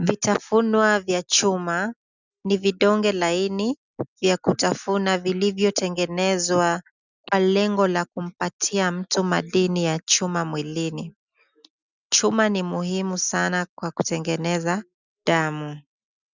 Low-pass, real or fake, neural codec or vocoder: 7.2 kHz; real; none